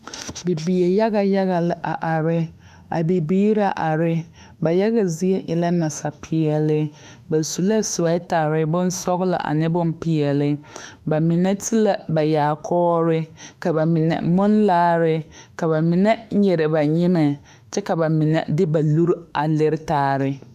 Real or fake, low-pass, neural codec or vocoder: fake; 14.4 kHz; autoencoder, 48 kHz, 32 numbers a frame, DAC-VAE, trained on Japanese speech